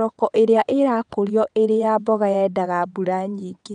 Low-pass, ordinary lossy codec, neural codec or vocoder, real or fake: 9.9 kHz; none; vocoder, 22.05 kHz, 80 mel bands, WaveNeXt; fake